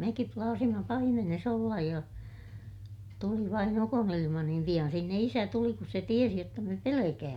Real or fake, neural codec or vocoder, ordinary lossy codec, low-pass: real; none; none; 19.8 kHz